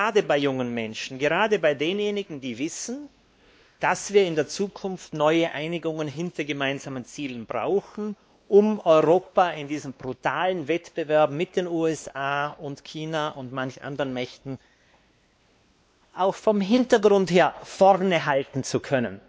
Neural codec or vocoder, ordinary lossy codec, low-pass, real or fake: codec, 16 kHz, 2 kbps, X-Codec, WavLM features, trained on Multilingual LibriSpeech; none; none; fake